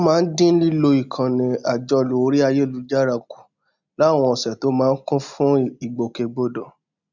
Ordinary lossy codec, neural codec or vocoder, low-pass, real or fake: none; none; 7.2 kHz; real